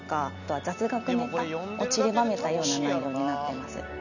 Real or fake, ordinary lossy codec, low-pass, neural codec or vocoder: real; none; 7.2 kHz; none